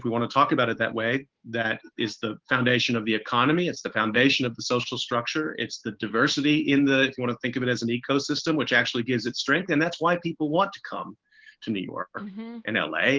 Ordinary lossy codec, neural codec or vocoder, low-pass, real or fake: Opus, 16 kbps; autoencoder, 48 kHz, 128 numbers a frame, DAC-VAE, trained on Japanese speech; 7.2 kHz; fake